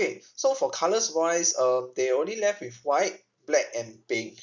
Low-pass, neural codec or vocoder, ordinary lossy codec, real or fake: 7.2 kHz; none; none; real